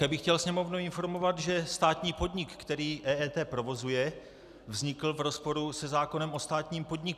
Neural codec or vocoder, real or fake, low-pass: none; real; 14.4 kHz